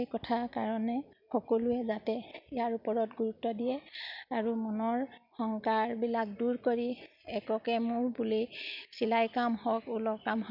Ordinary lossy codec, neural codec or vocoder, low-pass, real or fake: none; none; 5.4 kHz; real